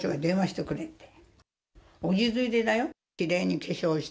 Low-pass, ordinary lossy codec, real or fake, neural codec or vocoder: none; none; real; none